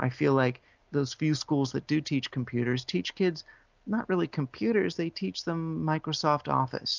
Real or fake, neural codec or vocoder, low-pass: real; none; 7.2 kHz